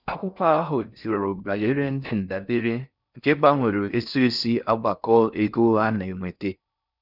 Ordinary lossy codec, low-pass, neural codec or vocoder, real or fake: none; 5.4 kHz; codec, 16 kHz in and 24 kHz out, 0.6 kbps, FocalCodec, streaming, 4096 codes; fake